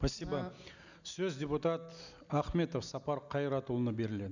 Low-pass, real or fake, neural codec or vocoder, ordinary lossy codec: 7.2 kHz; real; none; none